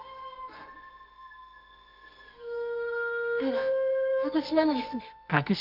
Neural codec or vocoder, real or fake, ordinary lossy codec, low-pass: codec, 24 kHz, 0.9 kbps, WavTokenizer, medium music audio release; fake; none; 5.4 kHz